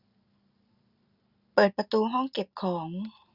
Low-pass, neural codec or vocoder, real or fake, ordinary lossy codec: 5.4 kHz; none; real; Opus, 64 kbps